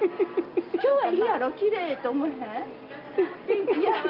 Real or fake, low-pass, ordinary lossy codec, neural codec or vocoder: real; 5.4 kHz; Opus, 24 kbps; none